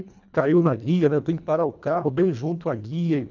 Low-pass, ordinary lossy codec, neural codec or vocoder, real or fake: 7.2 kHz; none; codec, 24 kHz, 1.5 kbps, HILCodec; fake